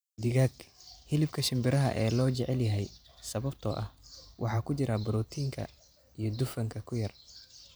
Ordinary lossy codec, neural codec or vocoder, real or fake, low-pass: none; vocoder, 44.1 kHz, 128 mel bands every 512 samples, BigVGAN v2; fake; none